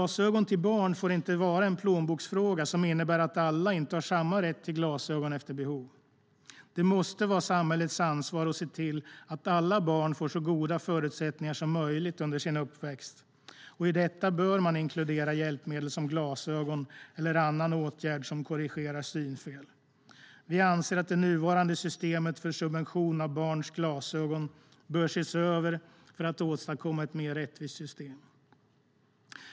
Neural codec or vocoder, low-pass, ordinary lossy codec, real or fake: none; none; none; real